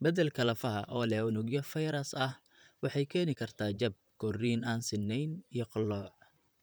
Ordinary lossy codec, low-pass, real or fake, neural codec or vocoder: none; none; fake; vocoder, 44.1 kHz, 128 mel bands, Pupu-Vocoder